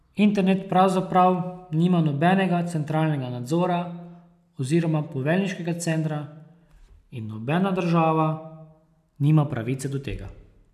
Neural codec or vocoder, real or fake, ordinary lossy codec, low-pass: none; real; none; 14.4 kHz